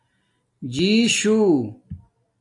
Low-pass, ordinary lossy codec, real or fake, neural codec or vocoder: 10.8 kHz; AAC, 64 kbps; real; none